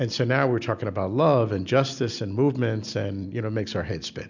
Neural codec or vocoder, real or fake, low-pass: none; real; 7.2 kHz